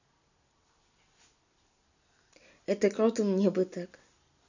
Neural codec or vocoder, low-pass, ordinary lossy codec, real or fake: none; 7.2 kHz; none; real